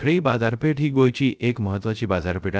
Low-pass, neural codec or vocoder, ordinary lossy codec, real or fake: none; codec, 16 kHz, 0.3 kbps, FocalCodec; none; fake